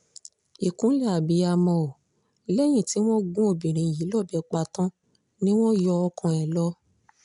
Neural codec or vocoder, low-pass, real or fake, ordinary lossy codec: none; 10.8 kHz; real; MP3, 96 kbps